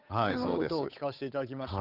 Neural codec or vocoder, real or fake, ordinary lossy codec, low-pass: codec, 16 kHz, 8 kbps, FunCodec, trained on Chinese and English, 25 frames a second; fake; none; 5.4 kHz